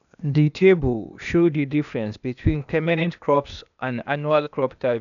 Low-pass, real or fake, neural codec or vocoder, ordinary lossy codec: 7.2 kHz; fake; codec, 16 kHz, 0.8 kbps, ZipCodec; none